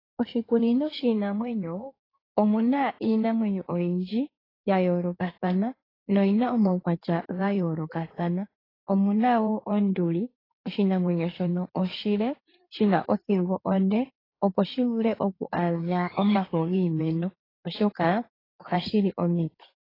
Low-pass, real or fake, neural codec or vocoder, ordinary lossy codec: 5.4 kHz; fake; codec, 16 kHz in and 24 kHz out, 2.2 kbps, FireRedTTS-2 codec; AAC, 24 kbps